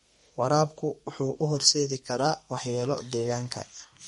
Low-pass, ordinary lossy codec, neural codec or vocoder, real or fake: 14.4 kHz; MP3, 48 kbps; codec, 32 kHz, 1.9 kbps, SNAC; fake